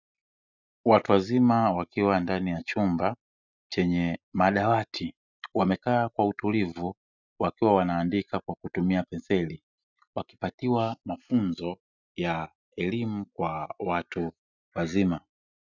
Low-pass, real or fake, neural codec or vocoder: 7.2 kHz; real; none